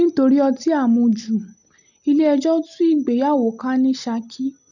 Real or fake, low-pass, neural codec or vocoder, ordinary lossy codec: real; 7.2 kHz; none; none